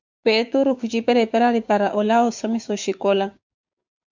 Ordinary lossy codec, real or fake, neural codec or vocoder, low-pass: MP3, 64 kbps; fake; codec, 16 kHz, 6 kbps, DAC; 7.2 kHz